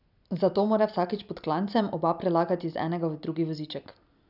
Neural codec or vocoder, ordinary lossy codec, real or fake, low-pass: none; none; real; 5.4 kHz